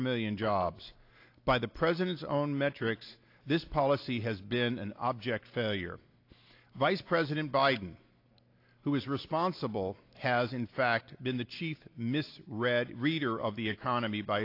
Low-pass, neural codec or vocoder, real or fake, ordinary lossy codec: 5.4 kHz; none; real; AAC, 32 kbps